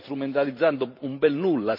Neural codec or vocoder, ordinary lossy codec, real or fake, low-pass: vocoder, 44.1 kHz, 128 mel bands every 512 samples, BigVGAN v2; none; fake; 5.4 kHz